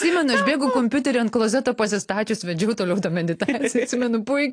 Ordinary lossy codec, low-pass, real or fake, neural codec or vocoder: AAC, 48 kbps; 9.9 kHz; real; none